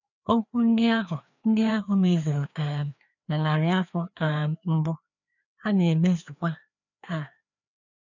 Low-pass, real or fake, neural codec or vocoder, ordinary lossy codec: 7.2 kHz; fake; codec, 16 kHz, 2 kbps, FreqCodec, larger model; none